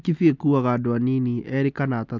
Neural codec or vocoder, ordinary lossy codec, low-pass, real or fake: none; MP3, 64 kbps; 7.2 kHz; real